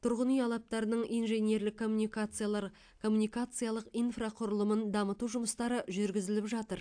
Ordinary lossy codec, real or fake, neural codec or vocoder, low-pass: AAC, 64 kbps; real; none; 9.9 kHz